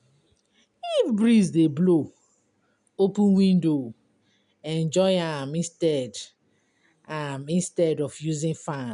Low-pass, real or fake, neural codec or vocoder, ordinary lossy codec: 10.8 kHz; real; none; none